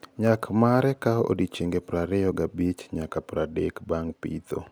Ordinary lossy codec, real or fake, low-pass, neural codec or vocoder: none; real; none; none